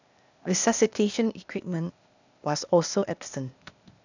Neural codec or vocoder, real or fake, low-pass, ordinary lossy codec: codec, 16 kHz, 0.8 kbps, ZipCodec; fake; 7.2 kHz; none